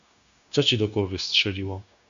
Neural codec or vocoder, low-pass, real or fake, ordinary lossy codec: codec, 16 kHz, 0.9 kbps, LongCat-Audio-Codec; 7.2 kHz; fake; AAC, 96 kbps